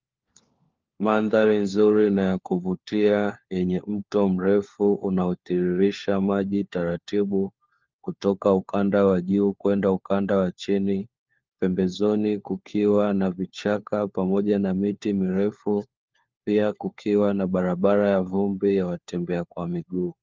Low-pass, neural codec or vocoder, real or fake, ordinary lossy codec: 7.2 kHz; codec, 16 kHz, 4 kbps, FunCodec, trained on LibriTTS, 50 frames a second; fake; Opus, 32 kbps